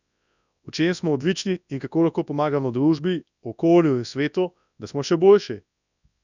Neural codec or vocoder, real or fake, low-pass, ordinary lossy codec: codec, 24 kHz, 0.9 kbps, WavTokenizer, large speech release; fake; 7.2 kHz; none